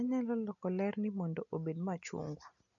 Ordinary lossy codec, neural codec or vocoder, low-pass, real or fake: none; none; 7.2 kHz; real